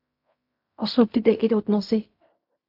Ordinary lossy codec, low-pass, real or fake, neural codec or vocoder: MP3, 48 kbps; 5.4 kHz; fake; codec, 16 kHz in and 24 kHz out, 0.4 kbps, LongCat-Audio-Codec, fine tuned four codebook decoder